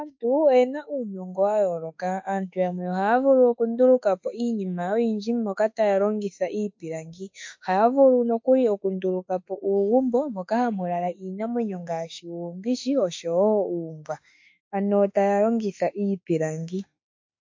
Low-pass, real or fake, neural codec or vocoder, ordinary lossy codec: 7.2 kHz; fake; autoencoder, 48 kHz, 32 numbers a frame, DAC-VAE, trained on Japanese speech; MP3, 48 kbps